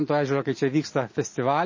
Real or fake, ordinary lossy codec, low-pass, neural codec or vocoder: real; MP3, 32 kbps; 7.2 kHz; none